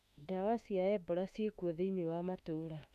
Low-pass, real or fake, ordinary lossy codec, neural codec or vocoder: 14.4 kHz; fake; MP3, 96 kbps; autoencoder, 48 kHz, 32 numbers a frame, DAC-VAE, trained on Japanese speech